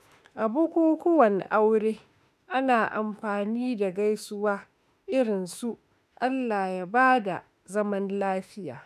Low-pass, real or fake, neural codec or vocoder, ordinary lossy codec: 14.4 kHz; fake; autoencoder, 48 kHz, 32 numbers a frame, DAC-VAE, trained on Japanese speech; none